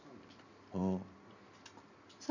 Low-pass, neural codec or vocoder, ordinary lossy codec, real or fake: 7.2 kHz; none; none; real